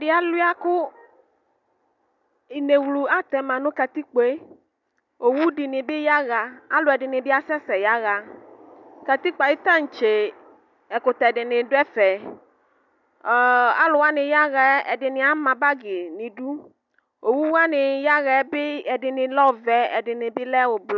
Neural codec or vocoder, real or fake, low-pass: none; real; 7.2 kHz